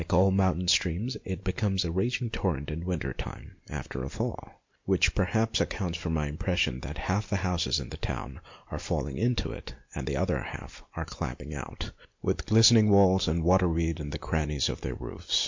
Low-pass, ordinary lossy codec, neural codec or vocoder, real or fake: 7.2 kHz; MP3, 48 kbps; none; real